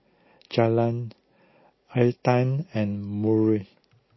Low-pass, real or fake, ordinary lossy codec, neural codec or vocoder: 7.2 kHz; real; MP3, 24 kbps; none